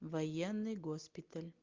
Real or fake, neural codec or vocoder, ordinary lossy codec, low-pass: real; none; Opus, 24 kbps; 7.2 kHz